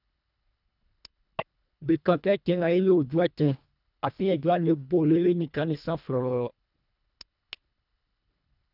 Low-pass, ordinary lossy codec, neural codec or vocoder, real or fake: 5.4 kHz; none; codec, 24 kHz, 1.5 kbps, HILCodec; fake